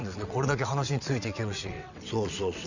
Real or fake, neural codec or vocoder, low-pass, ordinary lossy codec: fake; vocoder, 22.05 kHz, 80 mel bands, WaveNeXt; 7.2 kHz; none